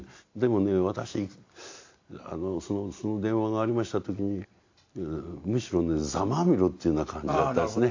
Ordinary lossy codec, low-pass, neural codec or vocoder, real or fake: AAC, 48 kbps; 7.2 kHz; none; real